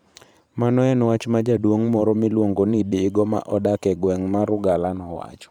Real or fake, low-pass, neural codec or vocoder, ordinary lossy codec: fake; 19.8 kHz; vocoder, 44.1 kHz, 128 mel bands every 512 samples, BigVGAN v2; none